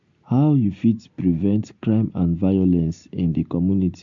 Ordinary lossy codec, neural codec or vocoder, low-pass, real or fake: MP3, 48 kbps; none; 7.2 kHz; real